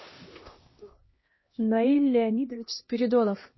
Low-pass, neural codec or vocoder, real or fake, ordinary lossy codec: 7.2 kHz; codec, 16 kHz, 1 kbps, X-Codec, HuBERT features, trained on LibriSpeech; fake; MP3, 24 kbps